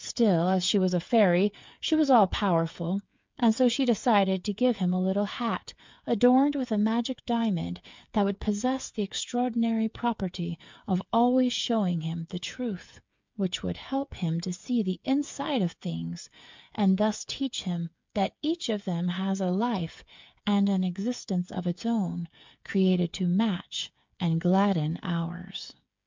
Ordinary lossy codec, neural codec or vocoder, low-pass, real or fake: MP3, 64 kbps; codec, 16 kHz, 8 kbps, FreqCodec, smaller model; 7.2 kHz; fake